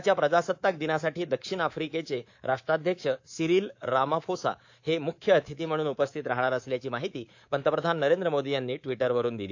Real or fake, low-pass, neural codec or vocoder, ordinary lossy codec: fake; 7.2 kHz; codec, 24 kHz, 3.1 kbps, DualCodec; AAC, 48 kbps